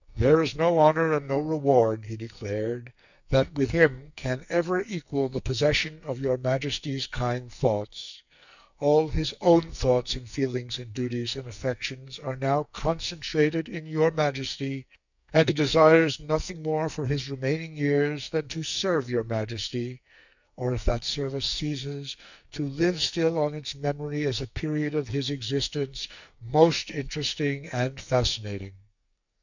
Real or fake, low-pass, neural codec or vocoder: fake; 7.2 kHz; codec, 44.1 kHz, 2.6 kbps, SNAC